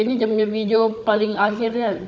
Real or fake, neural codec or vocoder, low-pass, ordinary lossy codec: fake; codec, 16 kHz, 16 kbps, FunCodec, trained on Chinese and English, 50 frames a second; none; none